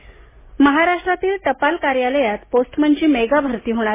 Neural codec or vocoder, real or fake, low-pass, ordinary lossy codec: none; real; 3.6 kHz; MP3, 16 kbps